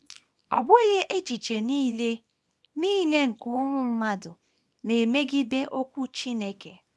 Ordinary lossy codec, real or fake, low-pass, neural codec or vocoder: none; fake; none; codec, 24 kHz, 0.9 kbps, WavTokenizer, small release